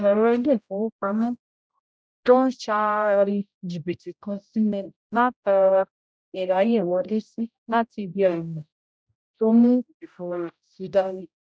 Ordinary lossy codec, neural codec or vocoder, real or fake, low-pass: none; codec, 16 kHz, 0.5 kbps, X-Codec, HuBERT features, trained on general audio; fake; none